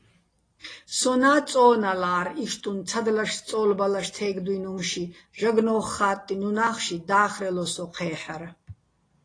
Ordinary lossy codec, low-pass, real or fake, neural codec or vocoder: AAC, 32 kbps; 9.9 kHz; real; none